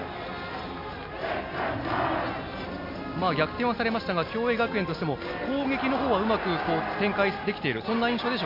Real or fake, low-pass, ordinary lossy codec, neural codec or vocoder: real; 5.4 kHz; MP3, 48 kbps; none